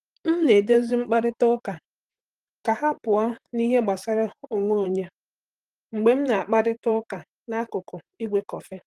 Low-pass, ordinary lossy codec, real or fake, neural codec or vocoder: 14.4 kHz; Opus, 24 kbps; fake; vocoder, 44.1 kHz, 128 mel bands, Pupu-Vocoder